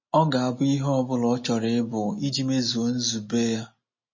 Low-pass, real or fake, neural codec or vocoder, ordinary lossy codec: 7.2 kHz; real; none; MP3, 32 kbps